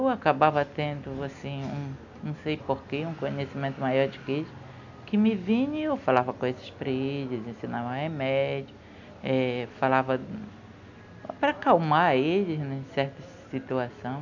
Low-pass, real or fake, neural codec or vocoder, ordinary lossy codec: 7.2 kHz; real; none; none